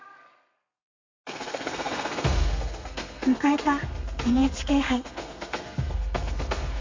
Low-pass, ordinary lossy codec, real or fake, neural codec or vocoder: 7.2 kHz; MP3, 48 kbps; fake; codec, 32 kHz, 1.9 kbps, SNAC